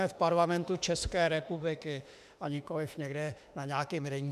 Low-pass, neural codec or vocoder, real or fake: 14.4 kHz; autoencoder, 48 kHz, 32 numbers a frame, DAC-VAE, trained on Japanese speech; fake